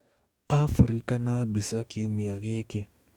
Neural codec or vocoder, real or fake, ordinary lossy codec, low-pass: codec, 44.1 kHz, 2.6 kbps, DAC; fake; Opus, 64 kbps; 19.8 kHz